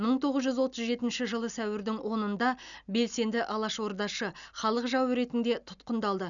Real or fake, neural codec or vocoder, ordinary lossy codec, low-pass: real; none; none; 7.2 kHz